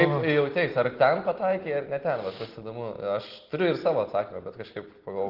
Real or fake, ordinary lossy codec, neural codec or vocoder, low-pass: real; Opus, 16 kbps; none; 5.4 kHz